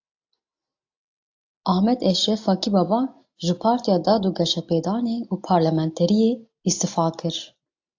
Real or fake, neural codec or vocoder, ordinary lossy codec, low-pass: real; none; AAC, 48 kbps; 7.2 kHz